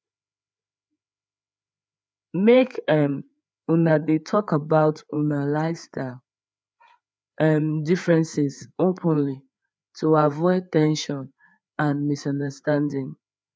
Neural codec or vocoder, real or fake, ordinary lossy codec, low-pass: codec, 16 kHz, 4 kbps, FreqCodec, larger model; fake; none; none